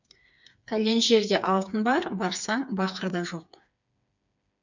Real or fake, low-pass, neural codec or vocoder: fake; 7.2 kHz; codec, 16 kHz, 4 kbps, FreqCodec, smaller model